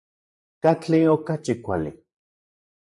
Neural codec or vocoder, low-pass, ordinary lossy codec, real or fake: vocoder, 44.1 kHz, 128 mel bands, Pupu-Vocoder; 10.8 kHz; MP3, 96 kbps; fake